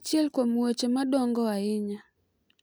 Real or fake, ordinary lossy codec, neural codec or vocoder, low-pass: real; none; none; none